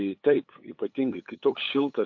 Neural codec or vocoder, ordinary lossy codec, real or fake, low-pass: codec, 16 kHz, 8 kbps, FunCodec, trained on LibriTTS, 25 frames a second; AAC, 48 kbps; fake; 7.2 kHz